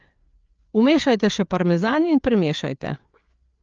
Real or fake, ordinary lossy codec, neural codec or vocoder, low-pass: fake; Opus, 24 kbps; codec, 16 kHz, 4 kbps, FreqCodec, larger model; 7.2 kHz